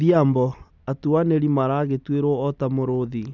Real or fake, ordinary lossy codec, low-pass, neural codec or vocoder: real; none; 7.2 kHz; none